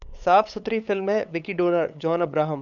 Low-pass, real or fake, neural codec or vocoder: 7.2 kHz; fake; codec, 16 kHz, 8 kbps, FunCodec, trained on LibriTTS, 25 frames a second